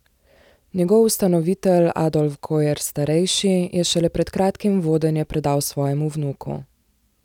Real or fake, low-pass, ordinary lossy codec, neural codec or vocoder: real; 19.8 kHz; none; none